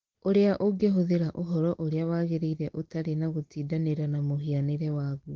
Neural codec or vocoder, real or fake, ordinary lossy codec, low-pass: none; real; Opus, 16 kbps; 7.2 kHz